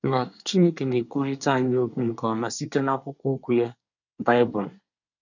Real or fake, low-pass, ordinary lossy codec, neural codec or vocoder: fake; 7.2 kHz; none; codec, 24 kHz, 1 kbps, SNAC